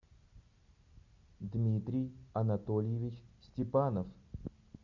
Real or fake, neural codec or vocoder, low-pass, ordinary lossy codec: real; none; 7.2 kHz; MP3, 64 kbps